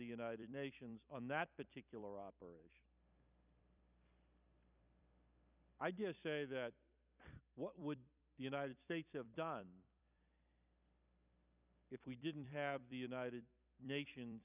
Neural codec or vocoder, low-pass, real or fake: vocoder, 44.1 kHz, 128 mel bands every 256 samples, BigVGAN v2; 3.6 kHz; fake